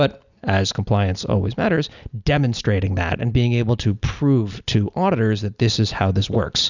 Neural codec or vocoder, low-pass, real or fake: none; 7.2 kHz; real